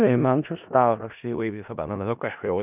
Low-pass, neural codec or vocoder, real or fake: 3.6 kHz; codec, 16 kHz in and 24 kHz out, 0.4 kbps, LongCat-Audio-Codec, four codebook decoder; fake